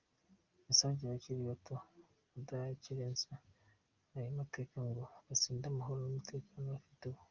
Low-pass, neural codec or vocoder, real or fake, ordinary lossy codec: 7.2 kHz; none; real; Opus, 24 kbps